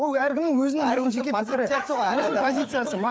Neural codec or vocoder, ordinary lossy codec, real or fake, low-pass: codec, 16 kHz, 4 kbps, FreqCodec, larger model; none; fake; none